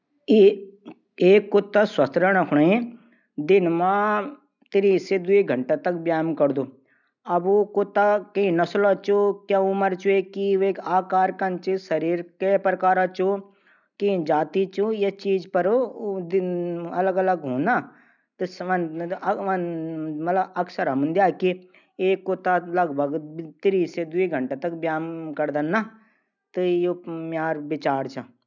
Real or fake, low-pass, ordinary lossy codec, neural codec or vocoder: real; 7.2 kHz; none; none